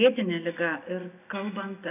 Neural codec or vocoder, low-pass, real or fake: none; 3.6 kHz; real